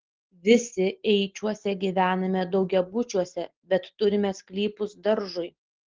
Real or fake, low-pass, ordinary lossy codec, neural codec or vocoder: real; 7.2 kHz; Opus, 16 kbps; none